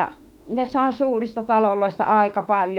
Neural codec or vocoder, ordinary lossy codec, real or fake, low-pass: autoencoder, 48 kHz, 32 numbers a frame, DAC-VAE, trained on Japanese speech; none; fake; 19.8 kHz